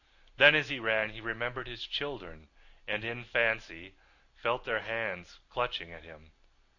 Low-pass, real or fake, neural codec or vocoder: 7.2 kHz; real; none